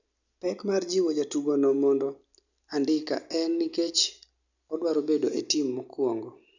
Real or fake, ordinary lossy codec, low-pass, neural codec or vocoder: real; none; 7.2 kHz; none